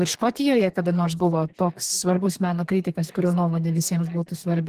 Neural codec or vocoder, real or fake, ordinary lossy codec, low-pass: codec, 32 kHz, 1.9 kbps, SNAC; fake; Opus, 16 kbps; 14.4 kHz